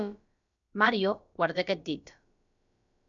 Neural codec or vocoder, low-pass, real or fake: codec, 16 kHz, about 1 kbps, DyCAST, with the encoder's durations; 7.2 kHz; fake